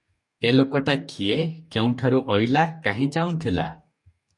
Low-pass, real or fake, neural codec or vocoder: 10.8 kHz; fake; codec, 44.1 kHz, 2.6 kbps, DAC